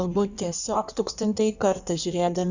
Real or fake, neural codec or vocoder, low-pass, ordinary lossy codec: fake; codec, 16 kHz in and 24 kHz out, 1.1 kbps, FireRedTTS-2 codec; 7.2 kHz; Opus, 64 kbps